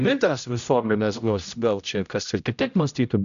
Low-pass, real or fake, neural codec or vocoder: 7.2 kHz; fake; codec, 16 kHz, 0.5 kbps, X-Codec, HuBERT features, trained on general audio